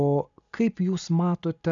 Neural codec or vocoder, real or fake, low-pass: none; real; 7.2 kHz